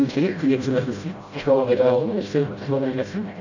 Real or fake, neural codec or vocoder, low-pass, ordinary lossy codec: fake; codec, 16 kHz, 0.5 kbps, FreqCodec, smaller model; 7.2 kHz; none